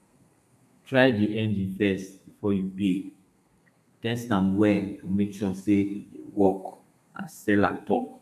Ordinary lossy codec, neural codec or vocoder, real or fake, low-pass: none; codec, 32 kHz, 1.9 kbps, SNAC; fake; 14.4 kHz